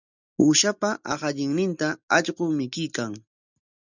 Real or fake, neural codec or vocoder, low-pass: real; none; 7.2 kHz